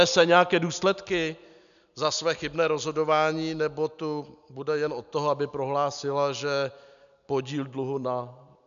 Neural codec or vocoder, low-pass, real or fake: none; 7.2 kHz; real